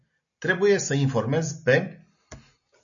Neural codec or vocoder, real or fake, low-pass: none; real; 7.2 kHz